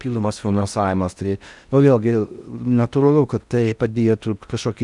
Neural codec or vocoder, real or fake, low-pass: codec, 16 kHz in and 24 kHz out, 0.6 kbps, FocalCodec, streaming, 4096 codes; fake; 10.8 kHz